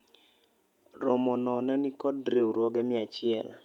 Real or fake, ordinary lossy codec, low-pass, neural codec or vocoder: fake; none; 19.8 kHz; vocoder, 48 kHz, 128 mel bands, Vocos